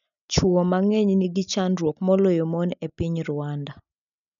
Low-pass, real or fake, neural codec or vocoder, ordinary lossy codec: 7.2 kHz; real; none; none